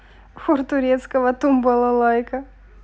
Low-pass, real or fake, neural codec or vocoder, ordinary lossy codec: none; real; none; none